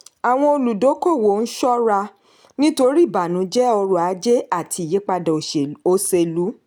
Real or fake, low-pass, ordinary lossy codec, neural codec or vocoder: real; none; none; none